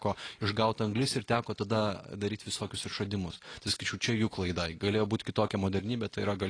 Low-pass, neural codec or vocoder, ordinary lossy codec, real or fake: 9.9 kHz; none; AAC, 32 kbps; real